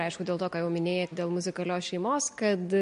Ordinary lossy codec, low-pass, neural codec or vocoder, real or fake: MP3, 48 kbps; 10.8 kHz; none; real